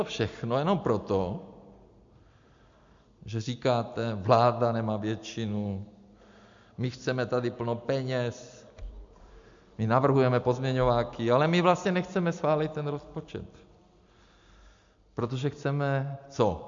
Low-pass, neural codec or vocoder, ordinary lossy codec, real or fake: 7.2 kHz; none; MP3, 64 kbps; real